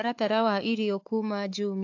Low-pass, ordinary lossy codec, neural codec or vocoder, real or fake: 7.2 kHz; AAC, 48 kbps; codec, 16 kHz, 4 kbps, FunCodec, trained on Chinese and English, 50 frames a second; fake